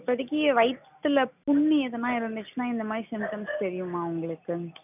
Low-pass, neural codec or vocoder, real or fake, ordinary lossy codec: 3.6 kHz; none; real; none